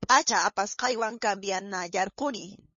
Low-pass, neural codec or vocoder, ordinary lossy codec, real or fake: 7.2 kHz; codec, 16 kHz, 2 kbps, FunCodec, trained on LibriTTS, 25 frames a second; MP3, 32 kbps; fake